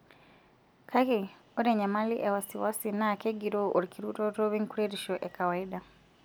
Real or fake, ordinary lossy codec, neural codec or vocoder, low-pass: real; none; none; none